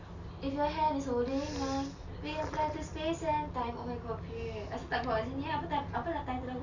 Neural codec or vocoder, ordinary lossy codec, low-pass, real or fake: none; none; 7.2 kHz; real